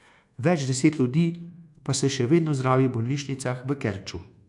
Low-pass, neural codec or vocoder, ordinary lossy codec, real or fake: 10.8 kHz; codec, 24 kHz, 1.2 kbps, DualCodec; AAC, 64 kbps; fake